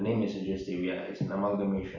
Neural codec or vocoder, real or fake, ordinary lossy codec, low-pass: none; real; none; 7.2 kHz